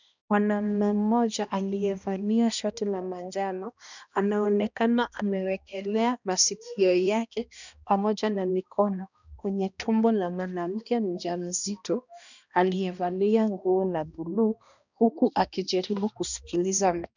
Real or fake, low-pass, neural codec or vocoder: fake; 7.2 kHz; codec, 16 kHz, 1 kbps, X-Codec, HuBERT features, trained on balanced general audio